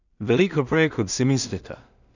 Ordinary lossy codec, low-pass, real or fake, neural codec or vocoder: none; 7.2 kHz; fake; codec, 16 kHz in and 24 kHz out, 0.4 kbps, LongCat-Audio-Codec, two codebook decoder